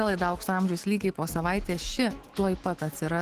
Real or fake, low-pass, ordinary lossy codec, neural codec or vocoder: fake; 14.4 kHz; Opus, 16 kbps; autoencoder, 48 kHz, 128 numbers a frame, DAC-VAE, trained on Japanese speech